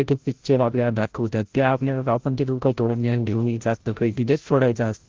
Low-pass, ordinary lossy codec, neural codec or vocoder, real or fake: 7.2 kHz; Opus, 16 kbps; codec, 16 kHz, 0.5 kbps, FreqCodec, larger model; fake